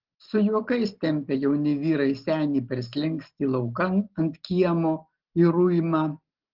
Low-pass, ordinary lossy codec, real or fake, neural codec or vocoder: 5.4 kHz; Opus, 16 kbps; real; none